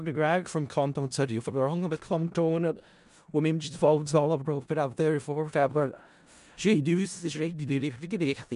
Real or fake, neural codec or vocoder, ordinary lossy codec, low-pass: fake; codec, 16 kHz in and 24 kHz out, 0.4 kbps, LongCat-Audio-Codec, four codebook decoder; MP3, 64 kbps; 10.8 kHz